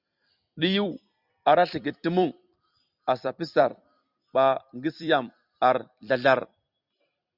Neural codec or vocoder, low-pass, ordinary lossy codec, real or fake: vocoder, 44.1 kHz, 128 mel bands every 256 samples, BigVGAN v2; 5.4 kHz; Opus, 64 kbps; fake